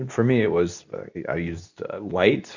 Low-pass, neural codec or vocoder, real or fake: 7.2 kHz; codec, 24 kHz, 0.9 kbps, WavTokenizer, medium speech release version 2; fake